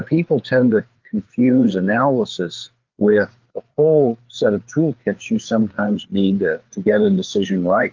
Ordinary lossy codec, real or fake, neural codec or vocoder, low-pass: Opus, 32 kbps; fake; codec, 16 kHz, 4 kbps, FreqCodec, larger model; 7.2 kHz